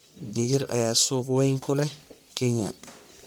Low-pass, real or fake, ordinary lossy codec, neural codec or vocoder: none; fake; none; codec, 44.1 kHz, 1.7 kbps, Pupu-Codec